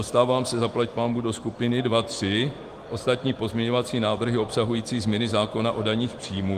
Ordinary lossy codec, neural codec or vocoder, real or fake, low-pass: Opus, 24 kbps; autoencoder, 48 kHz, 128 numbers a frame, DAC-VAE, trained on Japanese speech; fake; 14.4 kHz